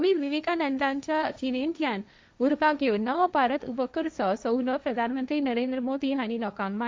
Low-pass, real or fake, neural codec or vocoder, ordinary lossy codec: none; fake; codec, 16 kHz, 1.1 kbps, Voila-Tokenizer; none